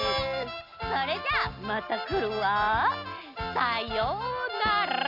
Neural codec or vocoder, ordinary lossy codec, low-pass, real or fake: none; none; 5.4 kHz; real